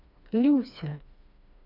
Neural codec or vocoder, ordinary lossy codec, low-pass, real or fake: codec, 16 kHz, 2 kbps, FreqCodec, smaller model; none; 5.4 kHz; fake